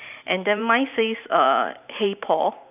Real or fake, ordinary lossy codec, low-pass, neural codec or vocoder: fake; none; 3.6 kHz; vocoder, 44.1 kHz, 128 mel bands every 512 samples, BigVGAN v2